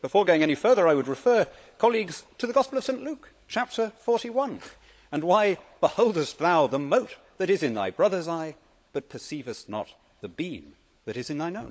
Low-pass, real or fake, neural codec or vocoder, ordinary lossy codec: none; fake; codec, 16 kHz, 16 kbps, FunCodec, trained on LibriTTS, 50 frames a second; none